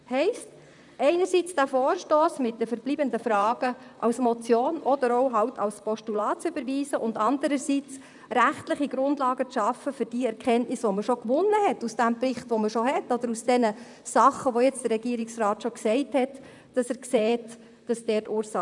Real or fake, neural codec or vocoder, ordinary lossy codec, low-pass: fake; vocoder, 44.1 kHz, 128 mel bands, Pupu-Vocoder; none; 10.8 kHz